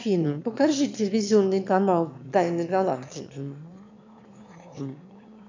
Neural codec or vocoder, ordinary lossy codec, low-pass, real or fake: autoencoder, 22.05 kHz, a latent of 192 numbers a frame, VITS, trained on one speaker; MP3, 64 kbps; 7.2 kHz; fake